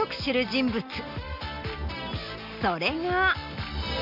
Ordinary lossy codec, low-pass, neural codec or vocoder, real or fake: AAC, 48 kbps; 5.4 kHz; none; real